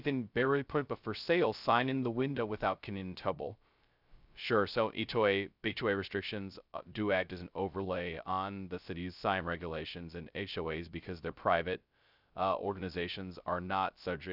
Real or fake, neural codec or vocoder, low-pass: fake; codec, 16 kHz, 0.2 kbps, FocalCodec; 5.4 kHz